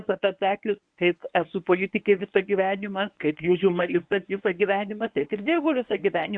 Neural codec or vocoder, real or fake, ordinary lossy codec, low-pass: codec, 24 kHz, 0.9 kbps, WavTokenizer, medium speech release version 1; fake; AAC, 64 kbps; 9.9 kHz